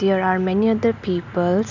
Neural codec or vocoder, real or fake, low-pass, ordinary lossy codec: none; real; 7.2 kHz; none